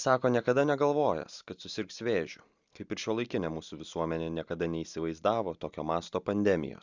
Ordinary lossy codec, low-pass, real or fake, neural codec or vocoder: Opus, 64 kbps; 7.2 kHz; real; none